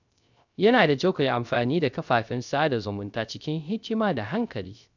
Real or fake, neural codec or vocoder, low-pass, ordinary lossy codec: fake; codec, 16 kHz, 0.3 kbps, FocalCodec; 7.2 kHz; none